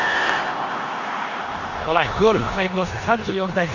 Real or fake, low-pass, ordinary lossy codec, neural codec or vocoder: fake; 7.2 kHz; none; codec, 16 kHz in and 24 kHz out, 0.9 kbps, LongCat-Audio-Codec, four codebook decoder